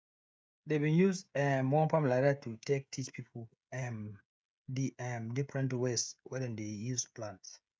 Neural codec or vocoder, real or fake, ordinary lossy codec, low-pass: codec, 16 kHz, 16 kbps, FreqCodec, smaller model; fake; none; none